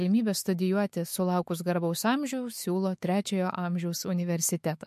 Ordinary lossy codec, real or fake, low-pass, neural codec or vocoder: MP3, 64 kbps; fake; 14.4 kHz; autoencoder, 48 kHz, 128 numbers a frame, DAC-VAE, trained on Japanese speech